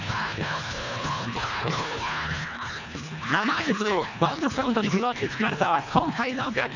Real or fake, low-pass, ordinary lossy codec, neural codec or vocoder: fake; 7.2 kHz; none; codec, 24 kHz, 1.5 kbps, HILCodec